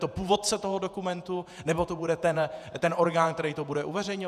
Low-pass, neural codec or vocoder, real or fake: 14.4 kHz; vocoder, 48 kHz, 128 mel bands, Vocos; fake